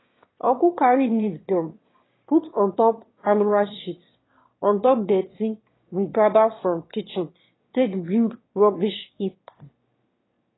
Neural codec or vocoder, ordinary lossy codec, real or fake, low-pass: autoencoder, 22.05 kHz, a latent of 192 numbers a frame, VITS, trained on one speaker; AAC, 16 kbps; fake; 7.2 kHz